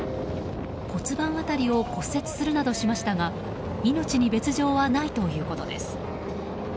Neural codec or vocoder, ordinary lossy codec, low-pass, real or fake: none; none; none; real